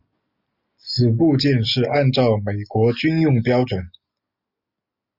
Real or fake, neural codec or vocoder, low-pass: real; none; 5.4 kHz